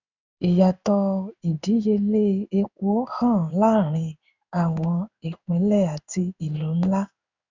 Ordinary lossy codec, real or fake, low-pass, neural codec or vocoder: none; fake; 7.2 kHz; codec, 16 kHz in and 24 kHz out, 1 kbps, XY-Tokenizer